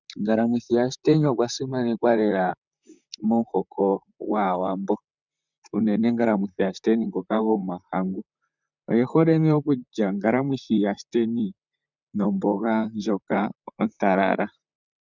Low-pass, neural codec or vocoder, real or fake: 7.2 kHz; vocoder, 44.1 kHz, 128 mel bands, Pupu-Vocoder; fake